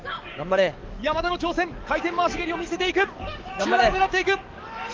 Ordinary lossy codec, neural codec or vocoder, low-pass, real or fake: Opus, 24 kbps; codec, 44.1 kHz, 7.8 kbps, DAC; 7.2 kHz; fake